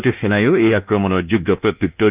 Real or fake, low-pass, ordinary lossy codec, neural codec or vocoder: fake; 3.6 kHz; Opus, 24 kbps; codec, 24 kHz, 1.2 kbps, DualCodec